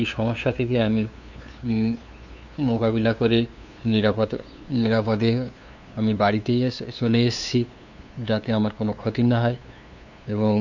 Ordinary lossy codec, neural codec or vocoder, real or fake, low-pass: none; codec, 16 kHz, 2 kbps, FunCodec, trained on LibriTTS, 25 frames a second; fake; 7.2 kHz